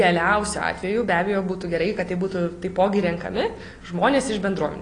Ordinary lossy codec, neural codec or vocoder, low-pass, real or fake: AAC, 32 kbps; none; 9.9 kHz; real